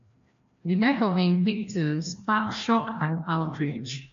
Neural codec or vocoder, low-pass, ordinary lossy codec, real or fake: codec, 16 kHz, 1 kbps, FreqCodec, larger model; 7.2 kHz; MP3, 48 kbps; fake